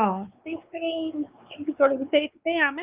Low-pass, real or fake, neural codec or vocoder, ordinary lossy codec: 3.6 kHz; fake; codec, 16 kHz, 4 kbps, X-Codec, WavLM features, trained on Multilingual LibriSpeech; Opus, 32 kbps